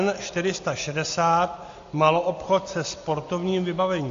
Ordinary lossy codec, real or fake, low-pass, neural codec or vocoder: AAC, 48 kbps; real; 7.2 kHz; none